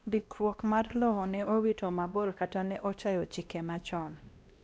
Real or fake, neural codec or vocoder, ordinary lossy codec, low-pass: fake; codec, 16 kHz, 1 kbps, X-Codec, WavLM features, trained on Multilingual LibriSpeech; none; none